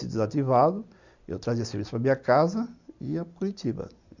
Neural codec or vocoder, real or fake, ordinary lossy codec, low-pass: none; real; none; 7.2 kHz